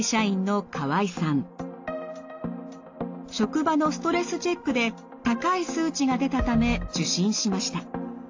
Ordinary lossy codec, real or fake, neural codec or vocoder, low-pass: AAC, 48 kbps; real; none; 7.2 kHz